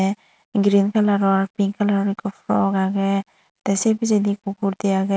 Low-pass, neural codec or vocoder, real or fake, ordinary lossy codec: none; none; real; none